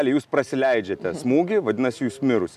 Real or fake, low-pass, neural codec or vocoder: real; 14.4 kHz; none